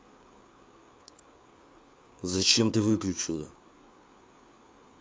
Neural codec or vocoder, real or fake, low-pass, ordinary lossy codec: codec, 16 kHz, 4 kbps, FreqCodec, larger model; fake; none; none